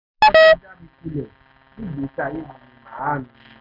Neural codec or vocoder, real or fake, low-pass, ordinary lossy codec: none; real; 5.4 kHz; none